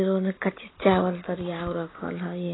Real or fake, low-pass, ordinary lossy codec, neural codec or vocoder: real; 7.2 kHz; AAC, 16 kbps; none